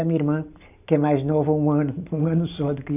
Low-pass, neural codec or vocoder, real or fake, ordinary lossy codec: 3.6 kHz; none; real; none